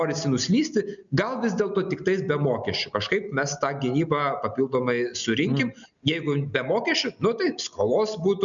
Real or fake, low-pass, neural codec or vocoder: real; 7.2 kHz; none